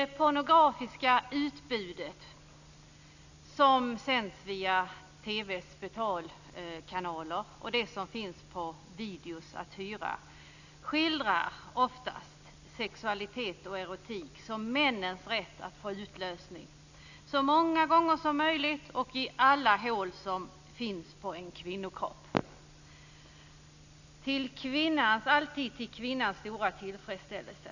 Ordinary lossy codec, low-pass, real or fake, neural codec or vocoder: none; 7.2 kHz; real; none